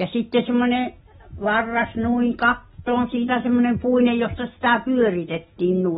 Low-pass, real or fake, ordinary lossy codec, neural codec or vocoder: 19.8 kHz; real; AAC, 16 kbps; none